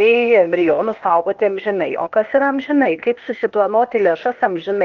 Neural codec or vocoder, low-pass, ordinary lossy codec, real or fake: codec, 16 kHz, 0.8 kbps, ZipCodec; 7.2 kHz; Opus, 24 kbps; fake